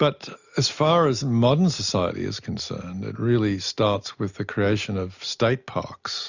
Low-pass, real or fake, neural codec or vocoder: 7.2 kHz; real; none